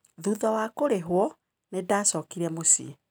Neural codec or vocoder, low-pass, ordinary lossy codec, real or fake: none; none; none; real